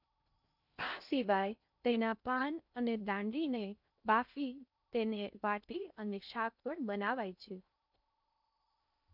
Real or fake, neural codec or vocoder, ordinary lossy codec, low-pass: fake; codec, 16 kHz in and 24 kHz out, 0.6 kbps, FocalCodec, streaming, 2048 codes; none; 5.4 kHz